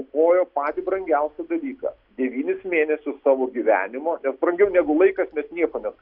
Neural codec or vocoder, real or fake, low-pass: none; real; 5.4 kHz